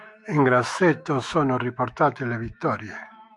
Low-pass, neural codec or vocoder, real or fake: 10.8 kHz; autoencoder, 48 kHz, 128 numbers a frame, DAC-VAE, trained on Japanese speech; fake